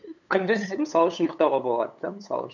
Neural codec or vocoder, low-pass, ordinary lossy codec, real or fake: codec, 16 kHz, 8 kbps, FunCodec, trained on LibriTTS, 25 frames a second; 7.2 kHz; none; fake